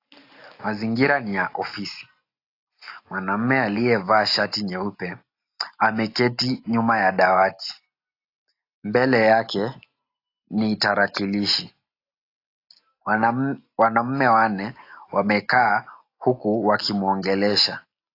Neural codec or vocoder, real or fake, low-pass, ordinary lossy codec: none; real; 5.4 kHz; AAC, 32 kbps